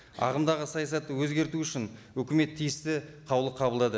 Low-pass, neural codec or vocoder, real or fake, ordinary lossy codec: none; none; real; none